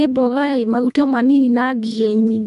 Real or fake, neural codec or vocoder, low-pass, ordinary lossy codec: fake; codec, 24 kHz, 1.5 kbps, HILCodec; 10.8 kHz; none